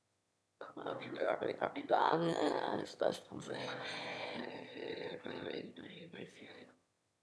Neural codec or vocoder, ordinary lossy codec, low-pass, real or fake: autoencoder, 22.05 kHz, a latent of 192 numbers a frame, VITS, trained on one speaker; none; none; fake